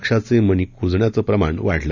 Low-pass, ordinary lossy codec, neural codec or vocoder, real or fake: 7.2 kHz; none; none; real